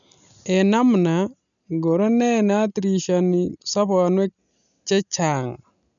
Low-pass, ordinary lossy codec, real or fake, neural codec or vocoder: 7.2 kHz; none; real; none